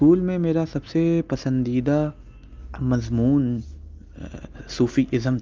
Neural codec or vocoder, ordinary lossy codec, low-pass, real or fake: none; Opus, 24 kbps; 7.2 kHz; real